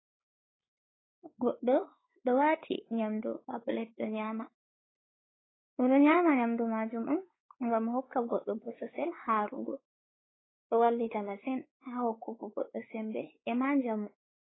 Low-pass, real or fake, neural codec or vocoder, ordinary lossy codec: 7.2 kHz; fake; codec, 16 kHz, 4 kbps, X-Codec, WavLM features, trained on Multilingual LibriSpeech; AAC, 16 kbps